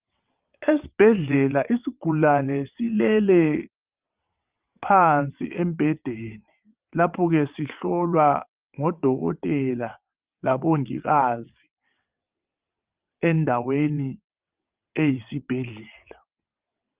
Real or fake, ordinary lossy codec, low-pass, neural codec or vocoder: fake; Opus, 24 kbps; 3.6 kHz; vocoder, 22.05 kHz, 80 mel bands, WaveNeXt